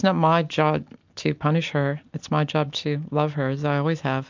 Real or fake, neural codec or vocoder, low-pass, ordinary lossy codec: real; none; 7.2 kHz; MP3, 64 kbps